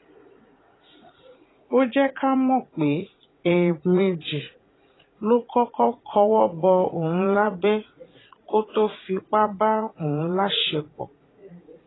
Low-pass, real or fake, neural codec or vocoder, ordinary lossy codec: 7.2 kHz; fake; vocoder, 44.1 kHz, 80 mel bands, Vocos; AAC, 16 kbps